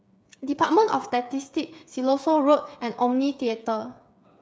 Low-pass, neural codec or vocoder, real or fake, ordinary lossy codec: none; codec, 16 kHz, 8 kbps, FreqCodec, smaller model; fake; none